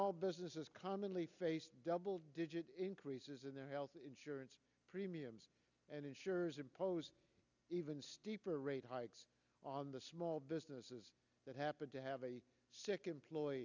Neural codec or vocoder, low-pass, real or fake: none; 7.2 kHz; real